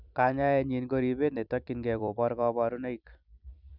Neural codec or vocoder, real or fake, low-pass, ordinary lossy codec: vocoder, 44.1 kHz, 128 mel bands every 256 samples, BigVGAN v2; fake; 5.4 kHz; none